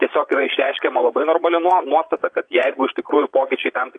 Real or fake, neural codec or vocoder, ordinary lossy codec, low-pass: fake; vocoder, 22.05 kHz, 80 mel bands, Vocos; AAC, 48 kbps; 9.9 kHz